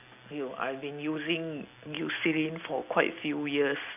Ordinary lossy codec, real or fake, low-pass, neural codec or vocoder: none; real; 3.6 kHz; none